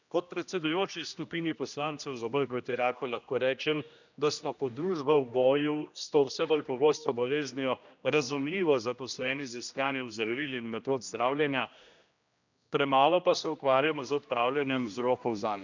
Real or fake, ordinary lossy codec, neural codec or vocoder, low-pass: fake; none; codec, 16 kHz, 1 kbps, X-Codec, HuBERT features, trained on general audio; 7.2 kHz